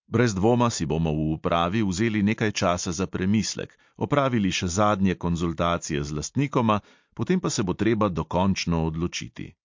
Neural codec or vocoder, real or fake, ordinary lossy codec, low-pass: none; real; MP3, 48 kbps; 7.2 kHz